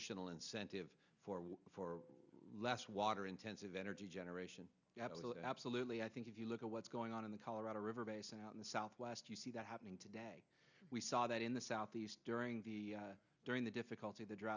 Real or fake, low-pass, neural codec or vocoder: real; 7.2 kHz; none